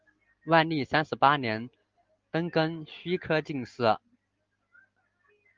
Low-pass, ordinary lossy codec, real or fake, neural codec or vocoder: 7.2 kHz; Opus, 32 kbps; real; none